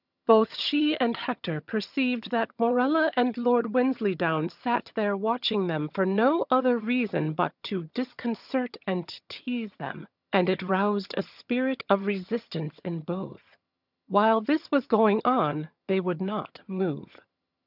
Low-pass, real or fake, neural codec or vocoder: 5.4 kHz; fake; vocoder, 22.05 kHz, 80 mel bands, HiFi-GAN